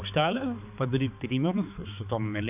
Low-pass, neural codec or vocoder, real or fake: 3.6 kHz; codec, 16 kHz, 4 kbps, X-Codec, HuBERT features, trained on balanced general audio; fake